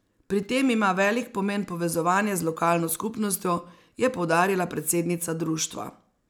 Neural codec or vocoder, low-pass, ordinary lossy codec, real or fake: none; none; none; real